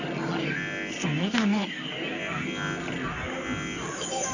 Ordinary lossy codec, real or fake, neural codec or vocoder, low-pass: none; fake; codec, 24 kHz, 0.9 kbps, WavTokenizer, medium music audio release; 7.2 kHz